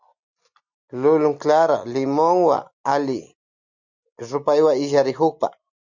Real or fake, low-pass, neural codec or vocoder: real; 7.2 kHz; none